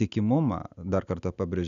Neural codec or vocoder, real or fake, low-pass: none; real; 7.2 kHz